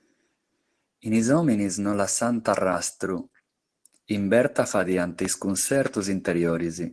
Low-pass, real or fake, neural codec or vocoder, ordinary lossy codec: 10.8 kHz; real; none; Opus, 16 kbps